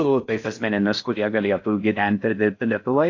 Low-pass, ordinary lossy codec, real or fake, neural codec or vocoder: 7.2 kHz; AAC, 48 kbps; fake; codec, 16 kHz in and 24 kHz out, 0.6 kbps, FocalCodec, streaming, 4096 codes